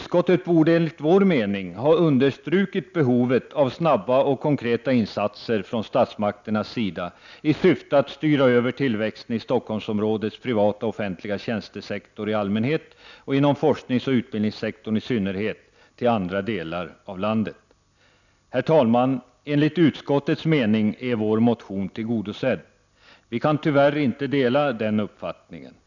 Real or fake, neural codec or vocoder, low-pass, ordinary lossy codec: real; none; 7.2 kHz; none